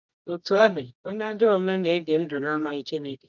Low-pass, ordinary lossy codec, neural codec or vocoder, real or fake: 7.2 kHz; none; codec, 24 kHz, 0.9 kbps, WavTokenizer, medium music audio release; fake